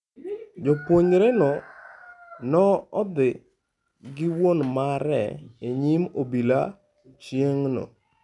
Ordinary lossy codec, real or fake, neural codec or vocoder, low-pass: none; real; none; 10.8 kHz